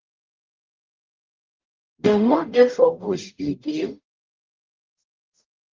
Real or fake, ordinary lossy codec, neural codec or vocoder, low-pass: fake; Opus, 24 kbps; codec, 44.1 kHz, 0.9 kbps, DAC; 7.2 kHz